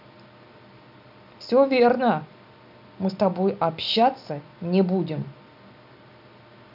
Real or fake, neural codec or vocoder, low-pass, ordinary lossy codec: real; none; 5.4 kHz; none